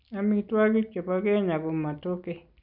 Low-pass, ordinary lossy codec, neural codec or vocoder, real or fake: 5.4 kHz; none; none; real